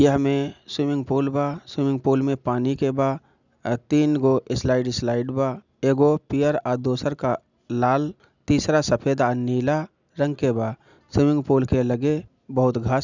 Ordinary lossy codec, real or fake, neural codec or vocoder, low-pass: none; real; none; 7.2 kHz